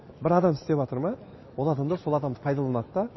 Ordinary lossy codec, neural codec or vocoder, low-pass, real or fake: MP3, 24 kbps; none; 7.2 kHz; real